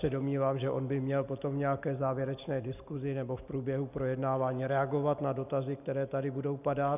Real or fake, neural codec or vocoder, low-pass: real; none; 3.6 kHz